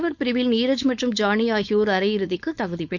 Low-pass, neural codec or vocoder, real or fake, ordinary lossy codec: 7.2 kHz; codec, 16 kHz, 4.8 kbps, FACodec; fake; Opus, 64 kbps